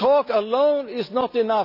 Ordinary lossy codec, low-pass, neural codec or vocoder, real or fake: none; 5.4 kHz; none; real